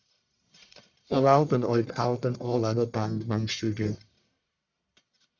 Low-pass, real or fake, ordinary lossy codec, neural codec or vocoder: 7.2 kHz; fake; MP3, 64 kbps; codec, 44.1 kHz, 1.7 kbps, Pupu-Codec